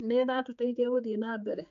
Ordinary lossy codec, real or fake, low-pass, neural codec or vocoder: none; fake; 7.2 kHz; codec, 16 kHz, 4 kbps, X-Codec, HuBERT features, trained on general audio